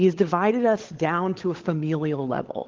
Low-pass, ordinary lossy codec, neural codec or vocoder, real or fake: 7.2 kHz; Opus, 16 kbps; codec, 16 kHz, 16 kbps, FunCodec, trained on LibriTTS, 50 frames a second; fake